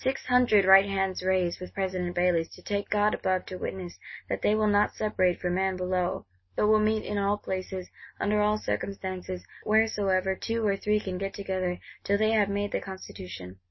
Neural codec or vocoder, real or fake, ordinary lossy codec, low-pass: none; real; MP3, 24 kbps; 7.2 kHz